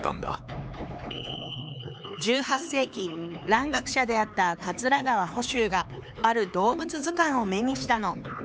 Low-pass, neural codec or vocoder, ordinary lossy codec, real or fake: none; codec, 16 kHz, 4 kbps, X-Codec, HuBERT features, trained on LibriSpeech; none; fake